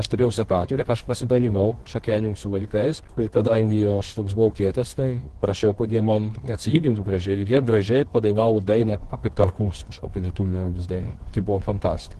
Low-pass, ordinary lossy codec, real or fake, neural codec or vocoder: 10.8 kHz; Opus, 16 kbps; fake; codec, 24 kHz, 0.9 kbps, WavTokenizer, medium music audio release